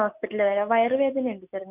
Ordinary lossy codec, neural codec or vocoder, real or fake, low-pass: none; none; real; 3.6 kHz